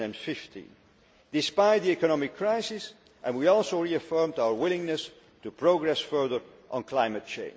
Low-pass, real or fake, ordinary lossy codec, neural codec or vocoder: none; real; none; none